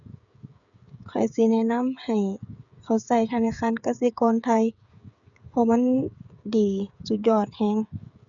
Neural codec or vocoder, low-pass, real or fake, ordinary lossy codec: codec, 16 kHz, 8 kbps, FreqCodec, smaller model; 7.2 kHz; fake; none